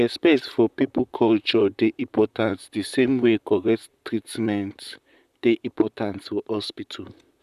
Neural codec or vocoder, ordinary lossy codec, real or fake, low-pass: vocoder, 44.1 kHz, 128 mel bands, Pupu-Vocoder; none; fake; 14.4 kHz